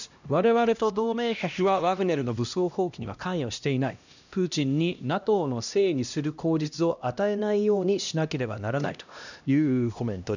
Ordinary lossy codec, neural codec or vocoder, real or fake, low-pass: none; codec, 16 kHz, 1 kbps, X-Codec, HuBERT features, trained on LibriSpeech; fake; 7.2 kHz